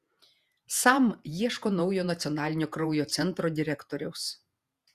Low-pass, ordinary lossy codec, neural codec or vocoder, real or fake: 14.4 kHz; Opus, 64 kbps; vocoder, 48 kHz, 128 mel bands, Vocos; fake